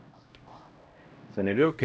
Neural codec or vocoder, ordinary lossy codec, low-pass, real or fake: codec, 16 kHz, 0.5 kbps, X-Codec, HuBERT features, trained on LibriSpeech; none; none; fake